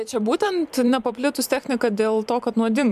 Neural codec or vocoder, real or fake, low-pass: vocoder, 44.1 kHz, 128 mel bands every 512 samples, BigVGAN v2; fake; 14.4 kHz